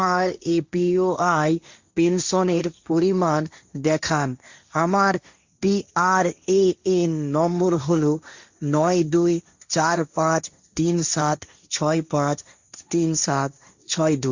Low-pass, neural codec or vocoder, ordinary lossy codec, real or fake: 7.2 kHz; codec, 16 kHz, 1.1 kbps, Voila-Tokenizer; Opus, 64 kbps; fake